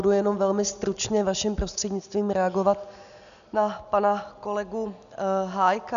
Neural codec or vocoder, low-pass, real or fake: none; 7.2 kHz; real